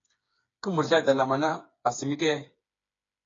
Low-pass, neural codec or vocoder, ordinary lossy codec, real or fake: 7.2 kHz; codec, 16 kHz, 4 kbps, FreqCodec, smaller model; AAC, 32 kbps; fake